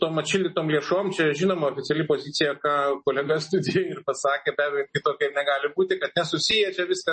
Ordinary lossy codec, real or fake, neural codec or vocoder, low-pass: MP3, 32 kbps; real; none; 10.8 kHz